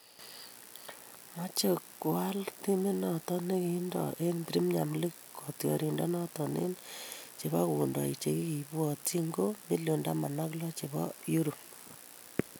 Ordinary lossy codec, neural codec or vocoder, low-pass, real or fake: none; none; none; real